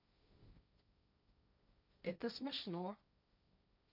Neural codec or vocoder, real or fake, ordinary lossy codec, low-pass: codec, 16 kHz, 1.1 kbps, Voila-Tokenizer; fake; none; 5.4 kHz